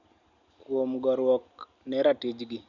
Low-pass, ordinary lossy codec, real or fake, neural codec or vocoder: 7.2 kHz; none; real; none